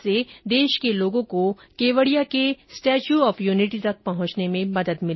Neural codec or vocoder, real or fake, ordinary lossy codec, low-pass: none; real; MP3, 24 kbps; 7.2 kHz